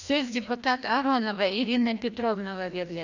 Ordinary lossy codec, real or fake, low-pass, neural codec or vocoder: none; fake; 7.2 kHz; codec, 16 kHz, 1 kbps, FreqCodec, larger model